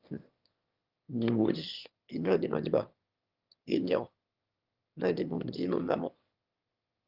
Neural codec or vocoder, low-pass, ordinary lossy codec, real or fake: autoencoder, 22.05 kHz, a latent of 192 numbers a frame, VITS, trained on one speaker; 5.4 kHz; Opus, 24 kbps; fake